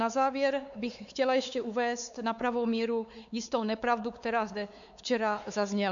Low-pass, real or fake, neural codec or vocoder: 7.2 kHz; fake; codec, 16 kHz, 4 kbps, X-Codec, WavLM features, trained on Multilingual LibriSpeech